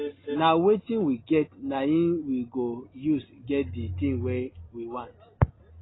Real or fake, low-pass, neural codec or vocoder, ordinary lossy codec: real; 7.2 kHz; none; AAC, 16 kbps